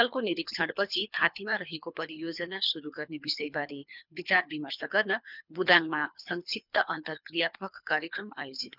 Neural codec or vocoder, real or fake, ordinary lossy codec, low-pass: codec, 24 kHz, 3 kbps, HILCodec; fake; none; 5.4 kHz